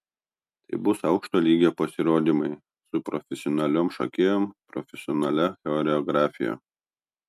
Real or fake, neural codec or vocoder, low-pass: real; none; 14.4 kHz